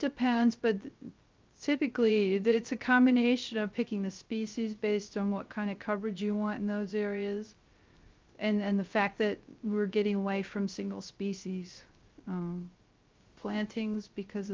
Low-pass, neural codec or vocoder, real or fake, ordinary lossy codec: 7.2 kHz; codec, 16 kHz, 0.3 kbps, FocalCodec; fake; Opus, 32 kbps